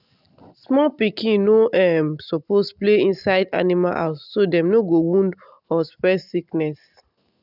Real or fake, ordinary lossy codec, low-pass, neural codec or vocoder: real; none; 5.4 kHz; none